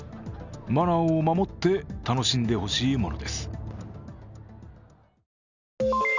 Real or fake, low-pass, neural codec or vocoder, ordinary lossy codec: real; 7.2 kHz; none; none